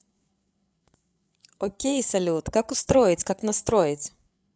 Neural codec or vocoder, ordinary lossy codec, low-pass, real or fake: codec, 16 kHz, 8 kbps, FreqCodec, larger model; none; none; fake